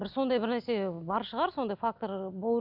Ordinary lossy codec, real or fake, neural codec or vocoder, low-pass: none; real; none; 5.4 kHz